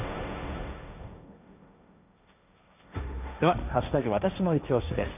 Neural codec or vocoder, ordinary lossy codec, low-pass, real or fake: codec, 16 kHz, 1.1 kbps, Voila-Tokenizer; none; 3.6 kHz; fake